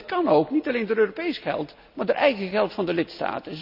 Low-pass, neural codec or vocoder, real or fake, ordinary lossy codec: 5.4 kHz; none; real; none